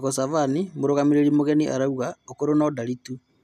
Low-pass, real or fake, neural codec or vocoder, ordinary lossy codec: 14.4 kHz; real; none; none